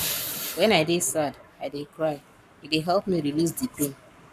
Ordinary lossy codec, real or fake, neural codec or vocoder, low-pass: none; fake; codec, 44.1 kHz, 7.8 kbps, Pupu-Codec; 14.4 kHz